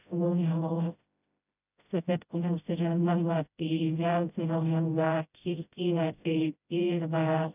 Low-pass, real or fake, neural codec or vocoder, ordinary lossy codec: 3.6 kHz; fake; codec, 16 kHz, 0.5 kbps, FreqCodec, smaller model; none